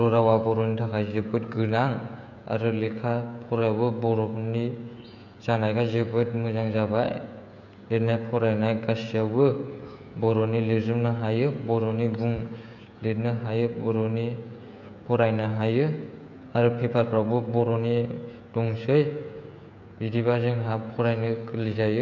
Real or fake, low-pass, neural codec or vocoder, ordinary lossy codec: fake; 7.2 kHz; codec, 16 kHz, 16 kbps, FreqCodec, smaller model; none